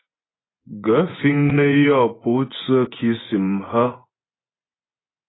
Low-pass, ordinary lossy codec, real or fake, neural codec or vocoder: 7.2 kHz; AAC, 16 kbps; fake; vocoder, 24 kHz, 100 mel bands, Vocos